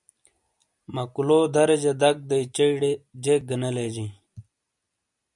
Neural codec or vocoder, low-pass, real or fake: none; 10.8 kHz; real